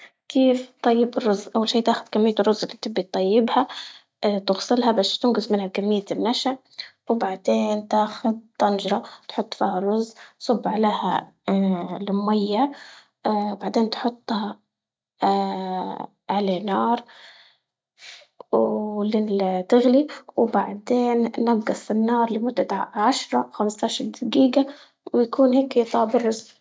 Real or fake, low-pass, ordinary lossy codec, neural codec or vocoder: real; none; none; none